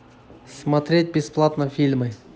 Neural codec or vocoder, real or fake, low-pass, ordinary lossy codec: none; real; none; none